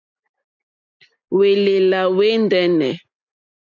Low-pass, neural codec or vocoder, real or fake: 7.2 kHz; none; real